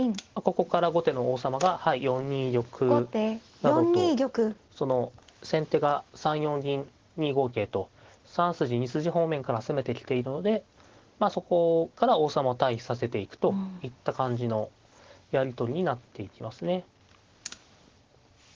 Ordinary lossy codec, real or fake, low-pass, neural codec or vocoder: Opus, 16 kbps; real; 7.2 kHz; none